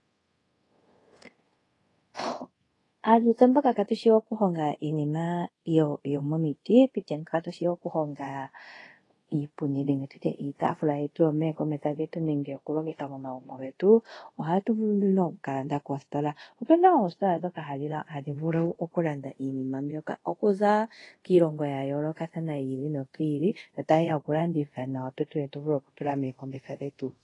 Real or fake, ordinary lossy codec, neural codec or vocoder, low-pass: fake; AAC, 32 kbps; codec, 24 kHz, 0.5 kbps, DualCodec; 10.8 kHz